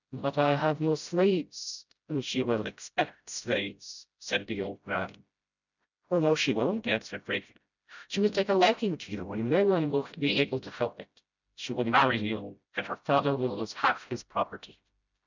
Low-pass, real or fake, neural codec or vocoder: 7.2 kHz; fake; codec, 16 kHz, 0.5 kbps, FreqCodec, smaller model